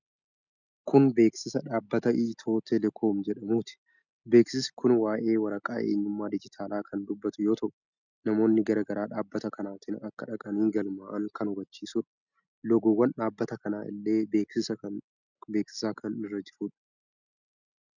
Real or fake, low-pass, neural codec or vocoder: real; 7.2 kHz; none